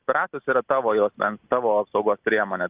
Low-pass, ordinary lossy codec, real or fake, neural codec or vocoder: 3.6 kHz; Opus, 32 kbps; real; none